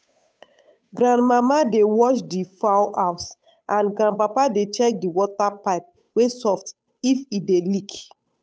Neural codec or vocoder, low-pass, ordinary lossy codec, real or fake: codec, 16 kHz, 8 kbps, FunCodec, trained on Chinese and English, 25 frames a second; none; none; fake